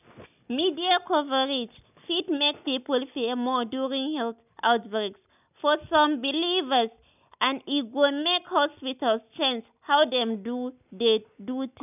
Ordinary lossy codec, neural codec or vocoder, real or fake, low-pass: none; none; real; 3.6 kHz